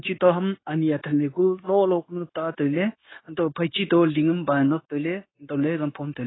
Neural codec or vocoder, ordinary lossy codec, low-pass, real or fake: codec, 16 kHz, 0.9 kbps, LongCat-Audio-Codec; AAC, 16 kbps; 7.2 kHz; fake